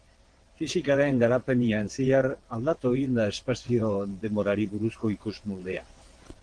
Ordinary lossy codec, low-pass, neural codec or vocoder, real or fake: Opus, 16 kbps; 9.9 kHz; vocoder, 22.05 kHz, 80 mel bands, WaveNeXt; fake